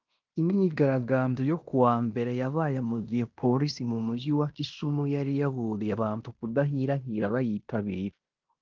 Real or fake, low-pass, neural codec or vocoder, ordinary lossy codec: fake; 7.2 kHz; codec, 16 kHz in and 24 kHz out, 0.9 kbps, LongCat-Audio-Codec, fine tuned four codebook decoder; Opus, 32 kbps